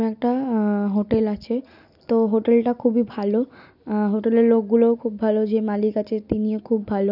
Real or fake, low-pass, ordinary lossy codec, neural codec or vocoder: real; 5.4 kHz; none; none